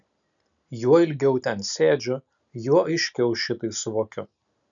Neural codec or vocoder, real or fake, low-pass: none; real; 7.2 kHz